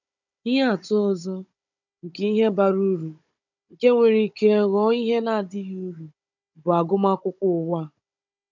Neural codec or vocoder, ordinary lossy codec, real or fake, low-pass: codec, 16 kHz, 16 kbps, FunCodec, trained on Chinese and English, 50 frames a second; none; fake; 7.2 kHz